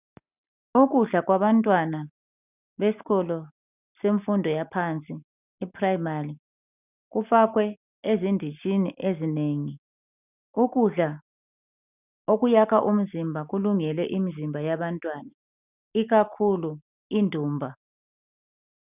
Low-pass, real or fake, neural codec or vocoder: 3.6 kHz; real; none